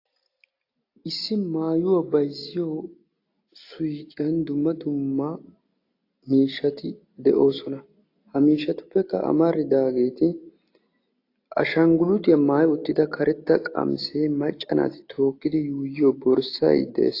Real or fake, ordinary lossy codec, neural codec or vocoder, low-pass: real; AAC, 32 kbps; none; 5.4 kHz